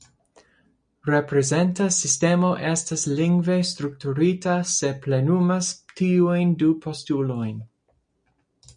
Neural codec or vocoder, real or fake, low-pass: none; real; 9.9 kHz